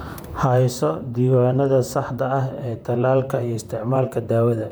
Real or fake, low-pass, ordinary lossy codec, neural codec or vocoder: fake; none; none; vocoder, 44.1 kHz, 128 mel bands, Pupu-Vocoder